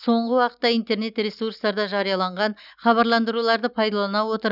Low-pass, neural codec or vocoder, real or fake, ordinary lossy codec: 5.4 kHz; none; real; none